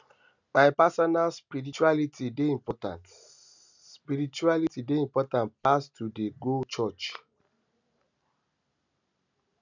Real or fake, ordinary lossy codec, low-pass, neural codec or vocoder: real; none; 7.2 kHz; none